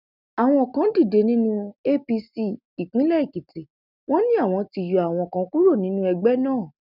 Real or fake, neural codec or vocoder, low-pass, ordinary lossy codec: real; none; 5.4 kHz; none